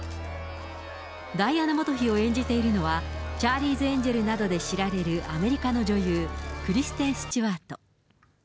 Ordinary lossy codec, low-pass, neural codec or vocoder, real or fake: none; none; none; real